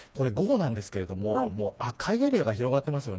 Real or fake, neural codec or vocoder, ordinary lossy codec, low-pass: fake; codec, 16 kHz, 2 kbps, FreqCodec, smaller model; none; none